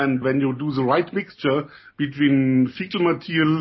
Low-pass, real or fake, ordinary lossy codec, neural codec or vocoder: 7.2 kHz; real; MP3, 24 kbps; none